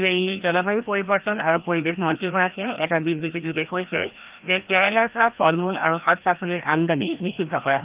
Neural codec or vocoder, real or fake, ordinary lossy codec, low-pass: codec, 16 kHz, 1 kbps, FreqCodec, larger model; fake; Opus, 32 kbps; 3.6 kHz